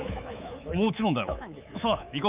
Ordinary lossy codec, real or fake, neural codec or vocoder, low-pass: Opus, 24 kbps; fake; codec, 24 kHz, 3.1 kbps, DualCodec; 3.6 kHz